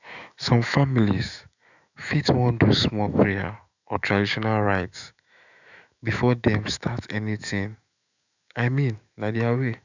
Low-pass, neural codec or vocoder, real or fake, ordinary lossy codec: 7.2 kHz; autoencoder, 48 kHz, 128 numbers a frame, DAC-VAE, trained on Japanese speech; fake; none